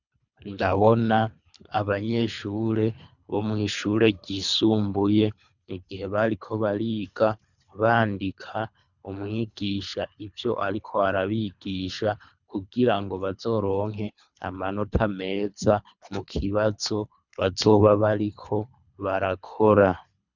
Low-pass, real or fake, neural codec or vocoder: 7.2 kHz; fake; codec, 24 kHz, 3 kbps, HILCodec